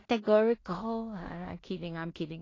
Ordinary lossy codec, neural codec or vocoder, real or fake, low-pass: AAC, 32 kbps; codec, 16 kHz in and 24 kHz out, 0.4 kbps, LongCat-Audio-Codec, two codebook decoder; fake; 7.2 kHz